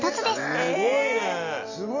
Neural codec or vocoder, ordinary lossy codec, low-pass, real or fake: none; none; 7.2 kHz; real